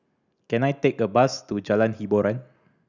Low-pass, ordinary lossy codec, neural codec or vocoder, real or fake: 7.2 kHz; none; none; real